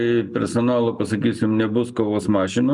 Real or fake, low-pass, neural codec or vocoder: real; 10.8 kHz; none